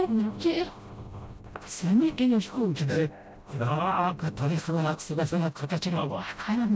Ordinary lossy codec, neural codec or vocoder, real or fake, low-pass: none; codec, 16 kHz, 0.5 kbps, FreqCodec, smaller model; fake; none